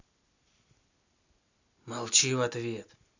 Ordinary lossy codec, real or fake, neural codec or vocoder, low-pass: none; real; none; 7.2 kHz